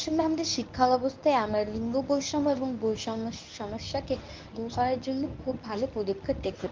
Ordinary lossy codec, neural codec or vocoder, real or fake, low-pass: Opus, 24 kbps; codec, 24 kHz, 0.9 kbps, WavTokenizer, medium speech release version 1; fake; 7.2 kHz